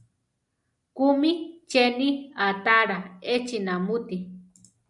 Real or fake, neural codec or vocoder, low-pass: real; none; 10.8 kHz